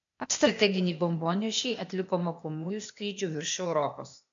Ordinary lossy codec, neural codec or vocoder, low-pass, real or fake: MP3, 64 kbps; codec, 16 kHz, 0.8 kbps, ZipCodec; 7.2 kHz; fake